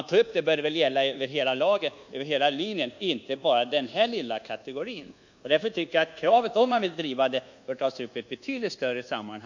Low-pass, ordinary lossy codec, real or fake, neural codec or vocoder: 7.2 kHz; none; fake; codec, 24 kHz, 1.2 kbps, DualCodec